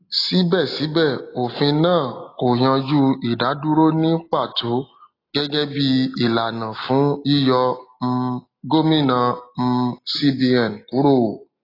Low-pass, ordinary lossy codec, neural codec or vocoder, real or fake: 5.4 kHz; AAC, 24 kbps; none; real